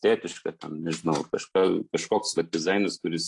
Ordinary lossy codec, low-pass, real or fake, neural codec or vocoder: AAC, 48 kbps; 10.8 kHz; real; none